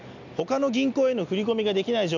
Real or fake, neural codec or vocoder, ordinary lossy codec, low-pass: real; none; none; 7.2 kHz